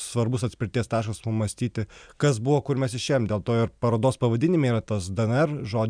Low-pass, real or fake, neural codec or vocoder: 9.9 kHz; real; none